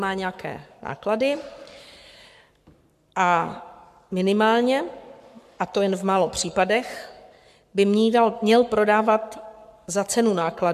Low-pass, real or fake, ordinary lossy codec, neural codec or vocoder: 14.4 kHz; fake; MP3, 96 kbps; codec, 44.1 kHz, 7.8 kbps, Pupu-Codec